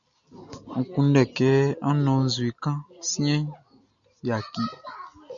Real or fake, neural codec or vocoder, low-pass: real; none; 7.2 kHz